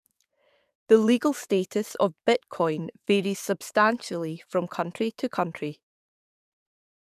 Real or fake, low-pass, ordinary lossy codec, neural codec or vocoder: fake; 14.4 kHz; none; codec, 44.1 kHz, 7.8 kbps, DAC